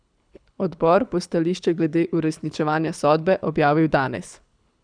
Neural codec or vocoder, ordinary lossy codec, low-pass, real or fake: codec, 24 kHz, 6 kbps, HILCodec; none; 9.9 kHz; fake